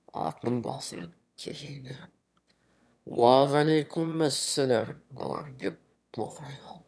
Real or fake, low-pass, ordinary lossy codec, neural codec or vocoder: fake; none; none; autoencoder, 22.05 kHz, a latent of 192 numbers a frame, VITS, trained on one speaker